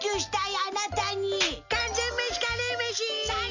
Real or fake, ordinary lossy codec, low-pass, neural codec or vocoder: real; none; 7.2 kHz; none